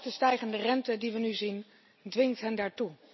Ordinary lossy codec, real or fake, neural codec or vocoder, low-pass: MP3, 24 kbps; real; none; 7.2 kHz